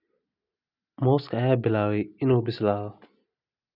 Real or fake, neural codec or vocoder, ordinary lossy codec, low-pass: real; none; none; 5.4 kHz